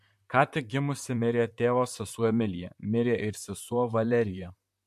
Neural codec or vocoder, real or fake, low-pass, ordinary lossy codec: vocoder, 44.1 kHz, 128 mel bands, Pupu-Vocoder; fake; 14.4 kHz; MP3, 64 kbps